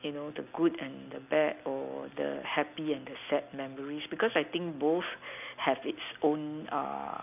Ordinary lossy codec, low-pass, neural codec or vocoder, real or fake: none; 3.6 kHz; none; real